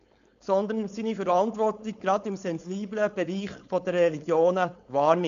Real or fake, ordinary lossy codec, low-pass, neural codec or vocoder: fake; MP3, 96 kbps; 7.2 kHz; codec, 16 kHz, 4.8 kbps, FACodec